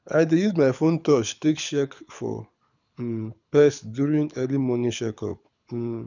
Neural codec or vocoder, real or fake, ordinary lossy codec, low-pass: codec, 24 kHz, 6 kbps, HILCodec; fake; none; 7.2 kHz